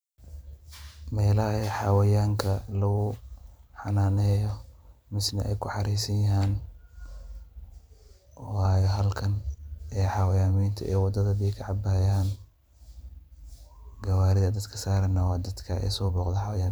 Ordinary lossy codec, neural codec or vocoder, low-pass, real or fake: none; vocoder, 44.1 kHz, 128 mel bands every 512 samples, BigVGAN v2; none; fake